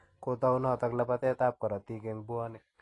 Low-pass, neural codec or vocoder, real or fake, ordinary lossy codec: 10.8 kHz; none; real; AAC, 32 kbps